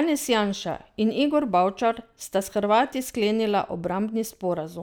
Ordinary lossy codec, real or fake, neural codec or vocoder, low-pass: none; real; none; none